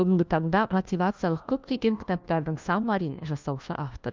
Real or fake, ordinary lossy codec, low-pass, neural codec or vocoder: fake; Opus, 24 kbps; 7.2 kHz; codec, 16 kHz, 1 kbps, FunCodec, trained on LibriTTS, 50 frames a second